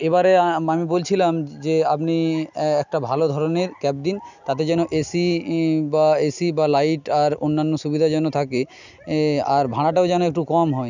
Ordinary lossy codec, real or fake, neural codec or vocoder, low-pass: none; real; none; 7.2 kHz